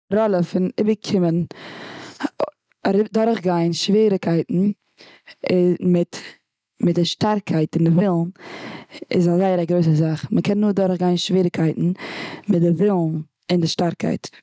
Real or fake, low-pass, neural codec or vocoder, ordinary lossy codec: real; none; none; none